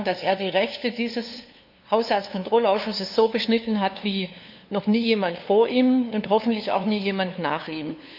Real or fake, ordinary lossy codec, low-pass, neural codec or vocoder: fake; none; 5.4 kHz; codec, 16 kHz, 2 kbps, FunCodec, trained on LibriTTS, 25 frames a second